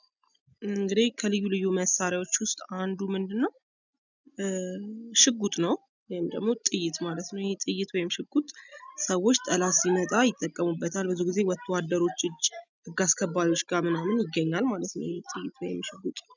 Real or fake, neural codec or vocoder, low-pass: real; none; 7.2 kHz